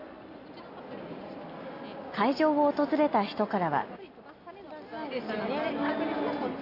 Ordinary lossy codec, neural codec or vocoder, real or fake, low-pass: AAC, 32 kbps; none; real; 5.4 kHz